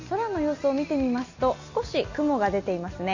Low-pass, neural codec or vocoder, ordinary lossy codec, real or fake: 7.2 kHz; none; none; real